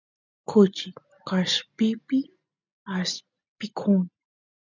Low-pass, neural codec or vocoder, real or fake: 7.2 kHz; none; real